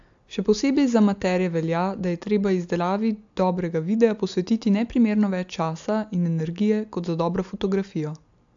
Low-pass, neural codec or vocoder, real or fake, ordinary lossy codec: 7.2 kHz; none; real; AAC, 64 kbps